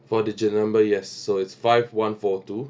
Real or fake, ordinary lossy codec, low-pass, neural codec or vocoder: real; none; none; none